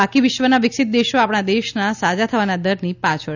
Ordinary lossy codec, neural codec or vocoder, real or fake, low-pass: none; none; real; 7.2 kHz